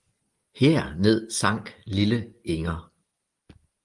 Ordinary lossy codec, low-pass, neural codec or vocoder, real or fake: Opus, 24 kbps; 10.8 kHz; none; real